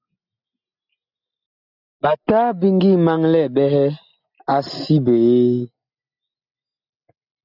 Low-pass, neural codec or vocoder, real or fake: 5.4 kHz; none; real